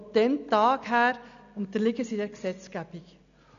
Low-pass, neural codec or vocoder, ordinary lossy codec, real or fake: 7.2 kHz; none; none; real